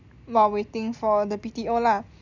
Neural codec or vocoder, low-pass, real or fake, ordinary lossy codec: none; 7.2 kHz; real; none